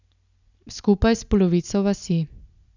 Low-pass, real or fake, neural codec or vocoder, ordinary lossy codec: 7.2 kHz; real; none; none